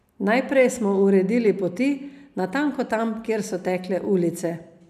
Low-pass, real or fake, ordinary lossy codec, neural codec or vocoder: 14.4 kHz; real; none; none